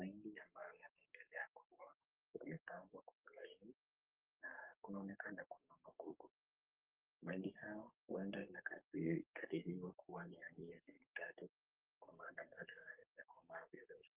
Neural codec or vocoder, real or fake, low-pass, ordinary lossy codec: codec, 44.1 kHz, 3.4 kbps, Pupu-Codec; fake; 3.6 kHz; Opus, 24 kbps